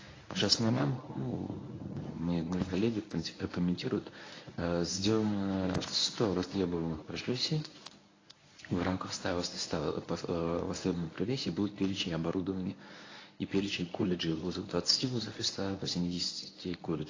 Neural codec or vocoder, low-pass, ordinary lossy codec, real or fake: codec, 24 kHz, 0.9 kbps, WavTokenizer, medium speech release version 1; 7.2 kHz; AAC, 32 kbps; fake